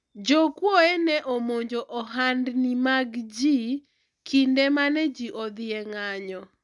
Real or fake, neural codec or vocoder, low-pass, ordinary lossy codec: real; none; 10.8 kHz; none